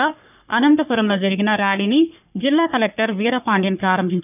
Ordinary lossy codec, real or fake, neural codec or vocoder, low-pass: none; fake; codec, 44.1 kHz, 3.4 kbps, Pupu-Codec; 3.6 kHz